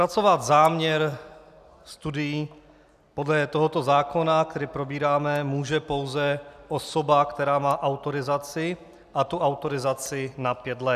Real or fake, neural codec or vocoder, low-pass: real; none; 14.4 kHz